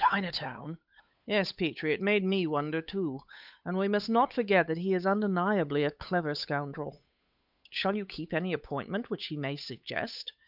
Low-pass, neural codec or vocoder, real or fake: 5.4 kHz; codec, 16 kHz, 8 kbps, FunCodec, trained on Chinese and English, 25 frames a second; fake